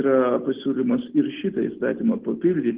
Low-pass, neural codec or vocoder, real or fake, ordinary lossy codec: 3.6 kHz; none; real; Opus, 16 kbps